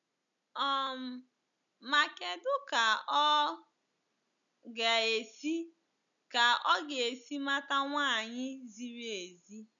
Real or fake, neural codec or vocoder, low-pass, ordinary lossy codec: real; none; 7.2 kHz; none